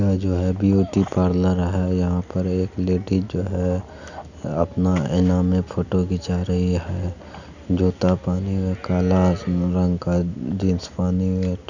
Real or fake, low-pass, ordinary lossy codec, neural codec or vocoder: real; 7.2 kHz; none; none